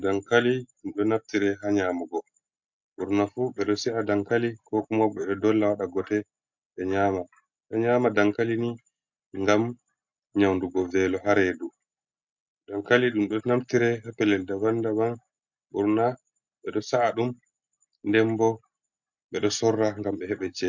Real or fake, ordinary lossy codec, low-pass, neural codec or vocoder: real; MP3, 64 kbps; 7.2 kHz; none